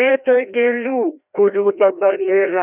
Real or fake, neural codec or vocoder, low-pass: fake; codec, 16 kHz, 1 kbps, FreqCodec, larger model; 3.6 kHz